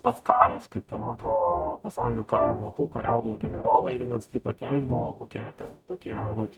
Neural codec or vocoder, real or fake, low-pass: codec, 44.1 kHz, 0.9 kbps, DAC; fake; 19.8 kHz